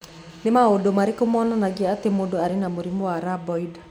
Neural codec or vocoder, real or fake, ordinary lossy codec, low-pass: none; real; none; 19.8 kHz